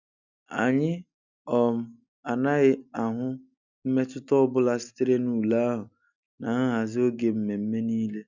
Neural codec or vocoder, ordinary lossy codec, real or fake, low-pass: none; none; real; 7.2 kHz